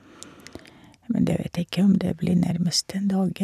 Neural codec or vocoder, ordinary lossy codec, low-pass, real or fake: none; none; 14.4 kHz; real